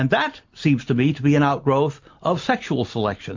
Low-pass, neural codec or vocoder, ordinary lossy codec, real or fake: 7.2 kHz; none; MP3, 48 kbps; real